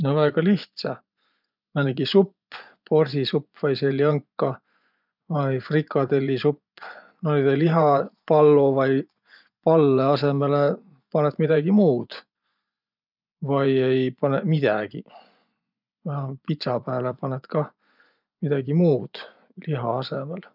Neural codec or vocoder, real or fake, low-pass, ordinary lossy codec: vocoder, 24 kHz, 100 mel bands, Vocos; fake; 5.4 kHz; none